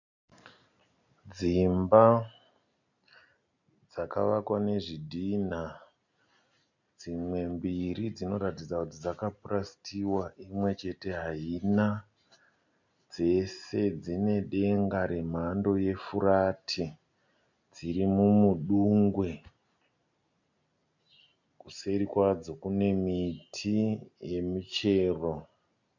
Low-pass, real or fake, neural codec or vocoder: 7.2 kHz; real; none